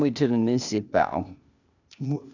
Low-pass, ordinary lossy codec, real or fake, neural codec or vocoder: 7.2 kHz; none; fake; codec, 16 kHz, 0.8 kbps, ZipCodec